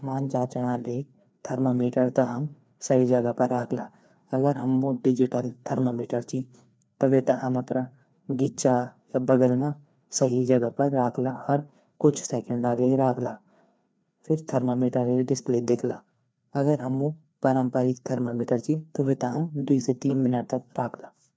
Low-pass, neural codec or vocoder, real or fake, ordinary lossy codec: none; codec, 16 kHz, 2 kbps, FreqCodec, larger model; fake; none